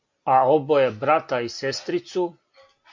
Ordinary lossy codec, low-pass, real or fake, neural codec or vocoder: MP3, 48 kbps; 7.2 kHz; real; none